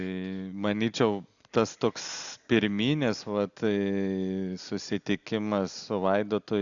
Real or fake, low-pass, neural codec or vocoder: real; 7.2 kHz; none